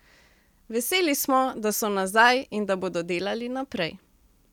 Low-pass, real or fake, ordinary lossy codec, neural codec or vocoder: 19.8 kHz; real; none; none